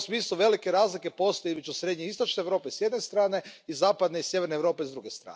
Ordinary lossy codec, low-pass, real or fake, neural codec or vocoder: none; none; real; none